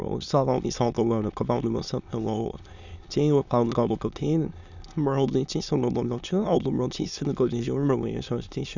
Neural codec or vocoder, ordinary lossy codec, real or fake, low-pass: autoencoder, 22.05 kHz, a latent of 192 numbers a frame, VITS, trained on many speakers; none; fake; 7.2 kHz